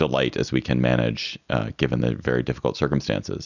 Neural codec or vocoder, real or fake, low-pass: none; real; 7.2 kHz